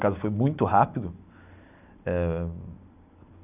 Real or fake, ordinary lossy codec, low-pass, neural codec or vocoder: real; none; 3.6 kHz; none